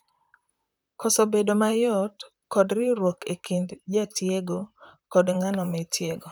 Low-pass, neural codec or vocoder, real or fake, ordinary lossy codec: none; vocoder, 44.1 kHz, 128 mel bands, Pupu-Vocoder; fake; none